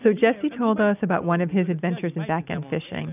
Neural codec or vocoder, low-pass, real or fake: none; 3.6 kHz; real